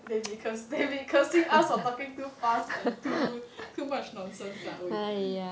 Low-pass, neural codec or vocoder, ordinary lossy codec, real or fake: none; none; none; real